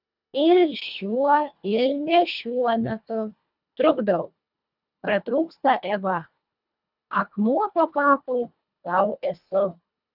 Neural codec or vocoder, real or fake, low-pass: codec, 24 kHz, 1.5 kbps, HILCodec; fake; 5.4 kHz